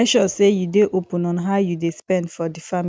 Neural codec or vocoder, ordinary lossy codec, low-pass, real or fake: none; none; none; real